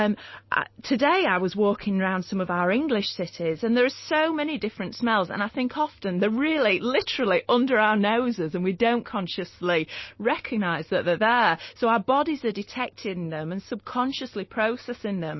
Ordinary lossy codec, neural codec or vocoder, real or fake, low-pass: MP3, 24 kbps; none; real; 7.2 kHz